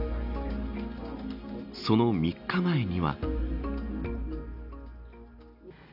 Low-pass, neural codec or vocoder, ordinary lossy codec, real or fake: 5.4 kHz; none; none; real